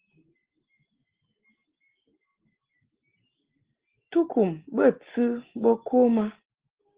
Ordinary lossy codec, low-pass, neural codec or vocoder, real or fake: Opus, 16 kbps; 3.6 kHz; none; real